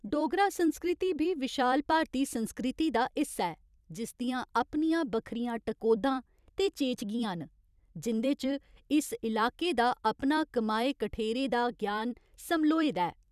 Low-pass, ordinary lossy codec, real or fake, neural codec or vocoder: 14.4 kHz; none; fake; vocoder, 44.1 kHz, 128 mel bands every 256 samples, BigVGAN v2